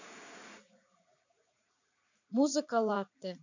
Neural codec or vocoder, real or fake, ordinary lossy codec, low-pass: vocoder, 44.1 kHz, 80 mel bands, Vocos; fake; none; 7.2 kHz